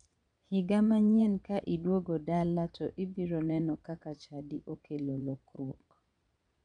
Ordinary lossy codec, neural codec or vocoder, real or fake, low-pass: none; vocoder, 22.05 kHz, 80 mel bands, Vocos; fake; 9.9 kHz